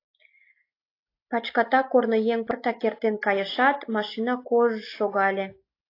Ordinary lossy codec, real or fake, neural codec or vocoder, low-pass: AAC, 32 kbps; real; none; 5.4 kHz